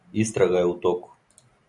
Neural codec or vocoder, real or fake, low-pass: none; real; 10.8 kHz